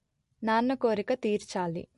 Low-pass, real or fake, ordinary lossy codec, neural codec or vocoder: 14.4 kHz; real; MP3, 48 kbps; none